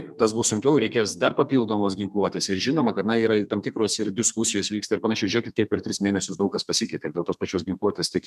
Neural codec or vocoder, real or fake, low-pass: codec, 32 kHz, 1.9 kbps, SNAC; fake; 14.4 kHz